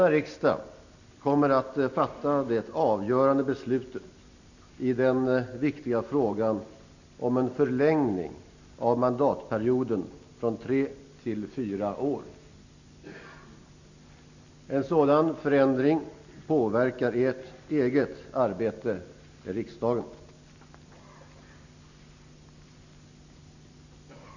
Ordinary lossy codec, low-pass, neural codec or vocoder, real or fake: none; 7.2 kHz; none; real